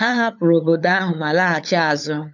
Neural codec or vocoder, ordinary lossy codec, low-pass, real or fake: codec, 16 kHz, 8 kbps, FunCodec, trained on LibriTTS, 25 frames a second; none; 7.2 kHz; fake